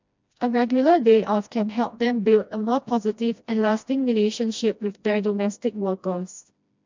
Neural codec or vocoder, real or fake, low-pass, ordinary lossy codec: codec, 16 kHz, 1 kbps, FreqCodec, smaller model; fake; 7.2 kHz; MP3, 64 kbps